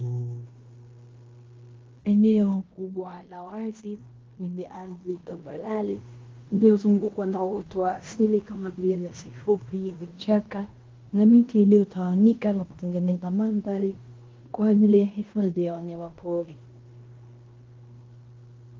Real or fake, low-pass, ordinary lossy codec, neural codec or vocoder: fake; 7.2 kHz; Opus, 32 kbps; codec, 16 kHz in and 24 kHz out, 0.9 kbps, LongCat-Audio-Codec, fine tuned four codebook decoder